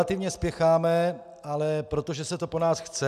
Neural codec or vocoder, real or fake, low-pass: none; real; 14.4 kHz